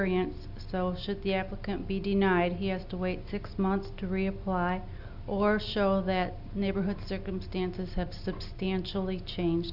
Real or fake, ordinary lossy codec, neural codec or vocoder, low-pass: real; Opus, 64 kbps; none; 5.4 kHz